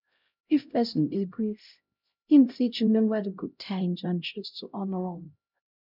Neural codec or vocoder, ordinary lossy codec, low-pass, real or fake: codec, 16 kHz, 0.5 kbps, X-Codec, HuBERT features, trained on LibriSpeech; none; 5.4 kHz; fake